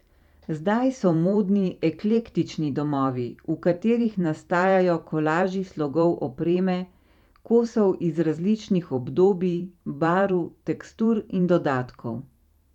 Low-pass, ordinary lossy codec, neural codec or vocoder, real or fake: 19.8 kHz; none; vocoder, 44.1 kHz, 128 mel bands every 512 samples, BigVGAN v2; fake